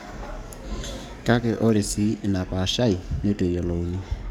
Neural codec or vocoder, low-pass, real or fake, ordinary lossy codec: codec, 44.1 kHz, 7.8 kbps, DAC; 19.8 kHz; fake; none